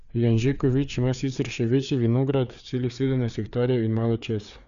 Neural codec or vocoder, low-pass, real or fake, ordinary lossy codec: codec, 16 kHz, 4 kbps, FreqCodec, larger model; 7.2 kHz; fake; none